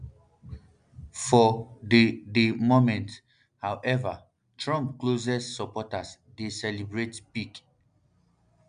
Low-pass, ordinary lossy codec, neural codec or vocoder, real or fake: 9.9 kHz; none; none; real